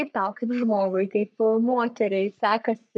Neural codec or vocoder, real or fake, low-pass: codec, 44.1 kHz, 3.4 kbps, Pupu-Codec; fake; 9.9 kHz